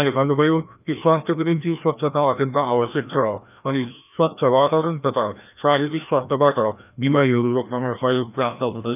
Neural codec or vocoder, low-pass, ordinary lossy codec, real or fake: codec, 16 kHz, 1 kbps, FreqCodec, larger model; 3.6 kHz; none; fake